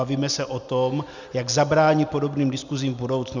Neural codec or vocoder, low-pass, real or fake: none; 7.2 kHz; real